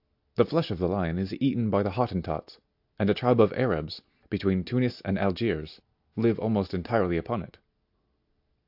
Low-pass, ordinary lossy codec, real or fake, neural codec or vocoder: 5.4 kHz; AAC, 48 kbps; real; none